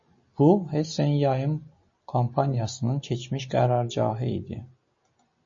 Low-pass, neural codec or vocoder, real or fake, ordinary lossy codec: 7.2 kHz; none; real; MP3, 32 kbps